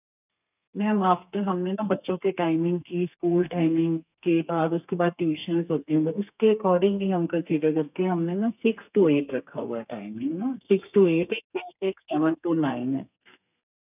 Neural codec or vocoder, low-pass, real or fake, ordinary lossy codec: codec, 32 kHz, 1.9 kbps, SNAC; 3.6 kHz; fake; none